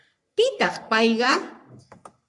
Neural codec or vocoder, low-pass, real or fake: codec, 44.1 kHz, 3.4 kbps, Pupu-Codec; 10.8 kHz; fake